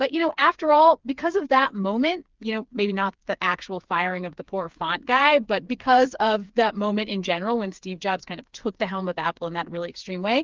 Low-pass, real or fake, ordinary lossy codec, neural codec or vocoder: 7.2 kHz; fake; Opus, 32 kbps; codec, 16 kHz, 4 kbps, FreqCodec, smaller model